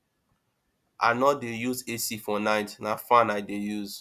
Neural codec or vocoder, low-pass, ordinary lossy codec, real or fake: none; 14.4 kHz; Opus, 64 kbps; real